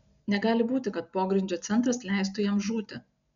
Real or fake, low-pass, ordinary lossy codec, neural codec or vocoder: real; 7.2 kHz; MP3, 96 kbps; none